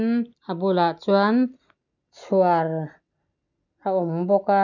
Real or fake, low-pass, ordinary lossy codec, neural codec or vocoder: real; 7.2 kHz; none; none